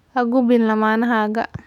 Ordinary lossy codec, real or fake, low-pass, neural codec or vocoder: none; fake; 19.8 kHz; autoencoder, 48 kHz, 128 numbers a frame, DAC-VAE, trained on Japanese speech